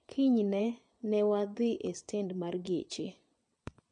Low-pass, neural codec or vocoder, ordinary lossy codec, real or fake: 9.9 kHz; vocoder, 22.05 kHz, 80 mel bands, WaveNeXt; MP3, 48 kbps; fake